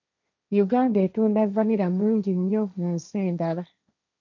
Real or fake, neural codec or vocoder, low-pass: fake; codec, 16 kHz, 1.1 kbps, Voila-Tokenizer; 7.2 kHz